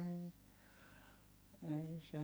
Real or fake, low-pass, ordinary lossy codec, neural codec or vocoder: fake; none; none; codec, 44.1 kHz, 2.6 kbps, SNAC